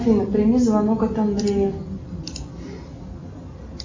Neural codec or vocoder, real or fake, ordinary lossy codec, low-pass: none; real; MP3, 48 kbps; 7.2 kHz